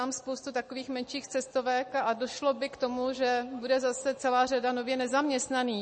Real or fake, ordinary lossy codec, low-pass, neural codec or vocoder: real; MP3, 32 kbps; 9.9 kHz; none